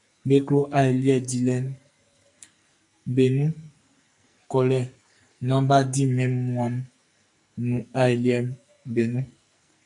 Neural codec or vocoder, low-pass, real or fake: codec, 44.1 kHz, 2.6 kbps, SNAC; 10.8 kHz; fake